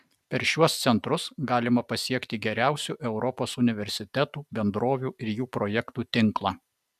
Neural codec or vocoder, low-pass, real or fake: none; 14.4 kHz; real